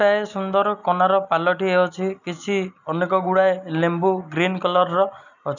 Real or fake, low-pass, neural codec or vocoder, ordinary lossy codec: real; 7.2 kHz; none; none